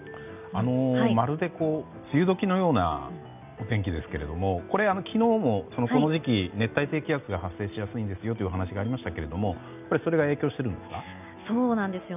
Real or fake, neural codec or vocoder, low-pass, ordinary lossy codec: real; none; 3.6 kHz; none